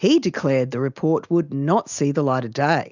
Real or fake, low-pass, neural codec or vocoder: real; 7.2 kHz; none